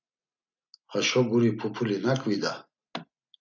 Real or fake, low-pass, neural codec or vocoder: real; 7.2 kHz; none